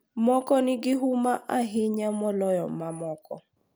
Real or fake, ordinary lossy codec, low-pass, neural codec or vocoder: real; none; none; none